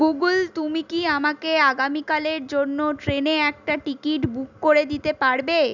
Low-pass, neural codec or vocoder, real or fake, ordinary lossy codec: 7.2 kHz; none; real; none